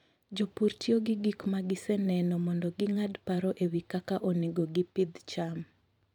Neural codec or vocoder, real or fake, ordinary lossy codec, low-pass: vocoder, 44.1 kHz, 128 mel bands every 512 samples, BigVGAN v2; fake; none; 19.8 kHz